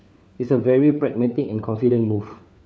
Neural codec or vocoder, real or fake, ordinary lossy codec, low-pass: codec, 16 kHz, 4 kbps, FunCodec, trained on LibriTTS, 50 frames a second; fake; none; none